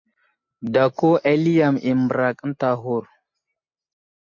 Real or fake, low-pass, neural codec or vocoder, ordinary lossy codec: real; 7.2 kHz; none; MP3, 48 kbps